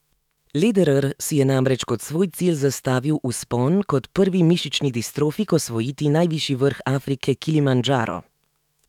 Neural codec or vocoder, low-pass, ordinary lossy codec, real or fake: autoencoder, 48 kHz, 128 numbers a frame, DAC-VAE, trained on Japanese speech; 19.8 kHz; none; fake